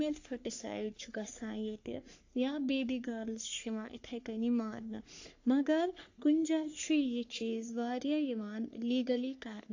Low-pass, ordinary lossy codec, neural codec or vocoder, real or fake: 7.2 kHz; AAC, 48 kbps; codec, 44.1 kHz, 3.4 kbps, Pupu-Codec; fake